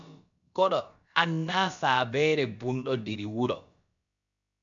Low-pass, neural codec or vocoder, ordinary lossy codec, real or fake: 7.2 kHz; codec, 16 kHz, about 1 kbps, DyCAST, with the encoder's durations; MP3, 96 kbps; fake